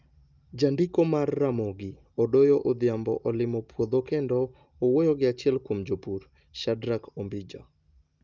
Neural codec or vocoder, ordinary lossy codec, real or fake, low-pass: none; Opus, 24 kbps; real; 7.2 kHz